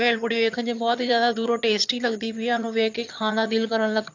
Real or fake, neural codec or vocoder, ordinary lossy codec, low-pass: fake; vocoder, 22.05 kHz, 80 mel bands, HiFi-GAN; AAC, 48 kbps; 7.2 kHz